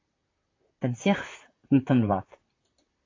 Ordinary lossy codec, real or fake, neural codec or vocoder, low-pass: AAC, 32 kbps; fake; vocoder, 44.1 kHz, 128 mel bands, Pupu-Vocoder; 7.2 kHz